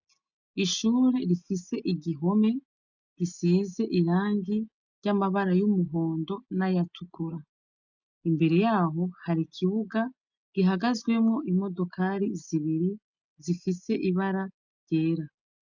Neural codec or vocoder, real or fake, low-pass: none; real; 7.2 kHz